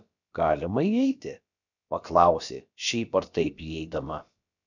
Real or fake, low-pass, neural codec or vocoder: fake; 7.2 kHz; codec, 16 kHz, about 1 kbps, DyCAST, with the encoder's durations